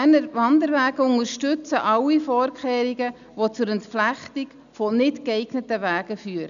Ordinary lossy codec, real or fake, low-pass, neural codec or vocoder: none; real; 7.2 kHz; none